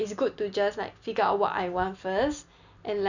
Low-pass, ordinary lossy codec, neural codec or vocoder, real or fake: 7.2 kHz; none; none; real